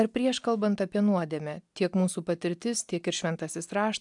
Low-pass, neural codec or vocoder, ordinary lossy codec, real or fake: 10.8 kHz; none; MP3, 96 kbps; real